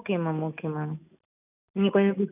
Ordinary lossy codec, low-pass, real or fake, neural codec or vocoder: none; 3.6 kHz; real; none